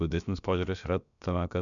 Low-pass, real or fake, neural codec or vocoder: 7.2 kHz; fake; codec, 16 kHz, about 1 kbps, DyCAST, with the encoder's durations